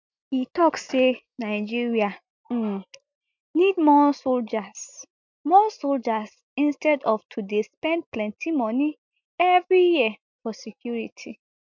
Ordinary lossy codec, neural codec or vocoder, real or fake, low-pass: MP3, 64 kbps; none; real; 7.2 kHz